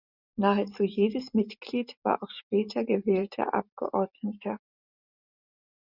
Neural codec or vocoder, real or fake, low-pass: vocoder, 44.1 kHz, 128 mel bands every 512 samples, BigVGAN v2; fake; 5.4 kHz